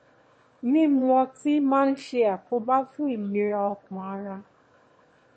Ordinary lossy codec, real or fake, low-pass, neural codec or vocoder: MP3, 32 kbps; fake; 9.9 kHz; autoencoder, 22.05 kHz, a latent of 192 numbers a frame, VITS, trained on one speaker